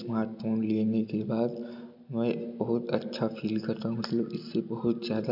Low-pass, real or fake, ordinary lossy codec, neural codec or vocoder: 5.4 kHz; real; none; none